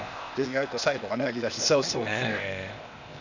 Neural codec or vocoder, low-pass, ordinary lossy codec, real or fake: codec, 16 kHz, 0.8 kbps, ZipCodec; 7.2 kHz; none; fake